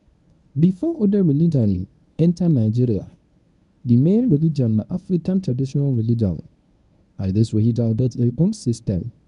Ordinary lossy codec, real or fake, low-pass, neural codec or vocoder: none; fake; 10.8 kHz; codec, 24 kHz, 0.9 kbps, WavTokenizer, medium speech release version 1